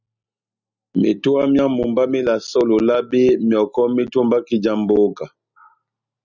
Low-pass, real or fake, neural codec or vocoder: 7.2 kHz; real; none